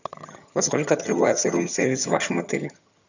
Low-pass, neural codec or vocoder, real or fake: 7.2 kHz; vocoder, 22.05 kHz, 80 mel bands, HiFi-GAN; fake